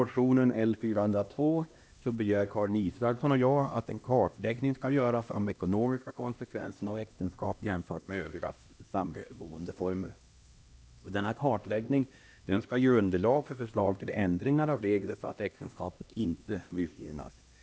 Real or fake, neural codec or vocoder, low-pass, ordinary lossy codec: fake; codec, 16 kHz, 1 kbps, X-Codec, HuBERT features, trained on LibriSpeech; none; none